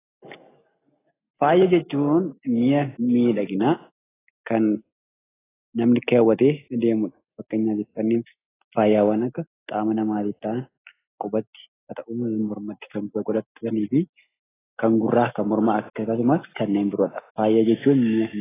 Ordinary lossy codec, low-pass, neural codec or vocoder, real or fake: AAC, 16 kbps; 3.6 kHz; none; real